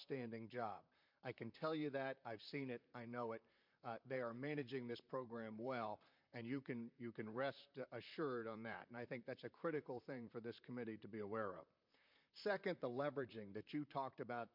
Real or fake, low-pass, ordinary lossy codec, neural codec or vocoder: fake; 5.4 kHz; MP3, 48 kbps; vocoder, 44.1 kHz, 128 mel bands, Pupu-Vocoder